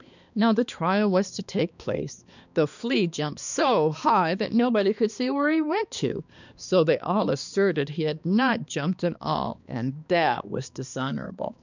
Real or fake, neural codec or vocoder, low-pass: fake; codec, 16 kHz, 2 kbps, X-Codec, HuBERT features, trained on balanced general audio; 7.2 kHz